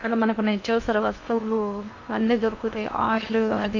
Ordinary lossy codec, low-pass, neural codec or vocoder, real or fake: none; 7.2 kHz; codec, 16 kHz in and 24 kHz out, 0.8 kbps, FocalCodec, streaming, 65536 codes; fake